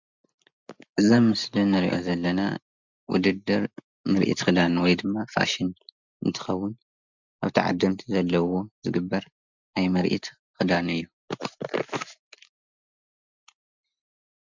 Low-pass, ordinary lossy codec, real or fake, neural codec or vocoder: 7.2 kHz; MP3, 64 kbps; real; none